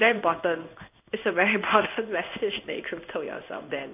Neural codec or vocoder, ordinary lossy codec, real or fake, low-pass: codec, 16 kHz in and 24 kHz out, 1 kbps, XY-Tokenizer; none; fake; 3.6 kHz